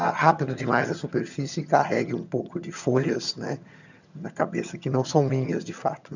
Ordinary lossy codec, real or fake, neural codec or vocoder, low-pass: none; fake; vocoder, 22.05 kHz, 80 mel bands, HiFi-GAN; 7.2 kHz